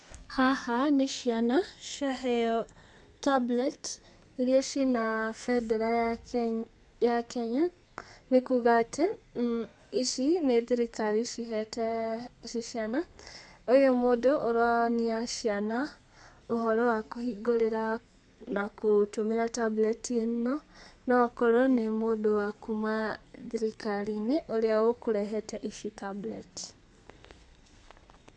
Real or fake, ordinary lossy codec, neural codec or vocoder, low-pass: fake; none; codec, 32 kHz, 1.9 kbps, SNAC; 10.8 kHz